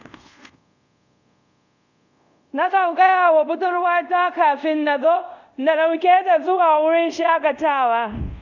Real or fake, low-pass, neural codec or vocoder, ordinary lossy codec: fake; 7.2 kHz; codec, 24 kHz, 0.5 kbps, DualCodec; none